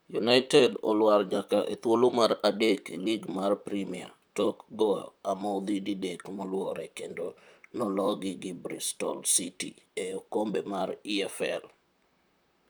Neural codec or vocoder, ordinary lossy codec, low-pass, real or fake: vocoder, 44.1 kHz, 128 mel bands, Pupu-Vocoder; none; none; fake